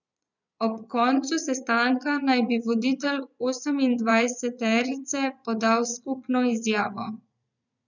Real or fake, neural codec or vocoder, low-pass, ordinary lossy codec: fake; vocoder, 22.05 kHz, 80 mel bands, Vocos; 7.2 kHz; none